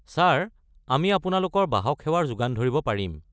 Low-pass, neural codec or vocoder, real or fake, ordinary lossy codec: none; none; real; none